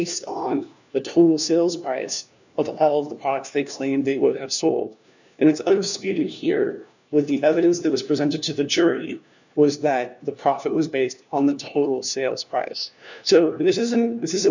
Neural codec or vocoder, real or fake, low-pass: codec, 16 kHz, 1 kbps, FunCodec, trained on LibriTTS, 50 frames a second; fake; 7.2 kHz